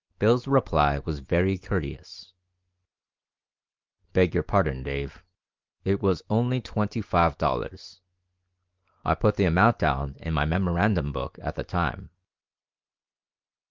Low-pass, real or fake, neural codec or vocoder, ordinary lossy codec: 7.2 kHz; real; none; Opus, 24 kbps